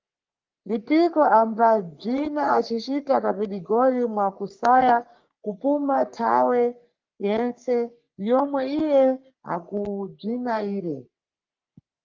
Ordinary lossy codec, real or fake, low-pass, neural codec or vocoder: Opus, 32 kbps; fake; 7.2 kHz; codec, 44.1 kHz, 3.4 kbps, Pupu-Codec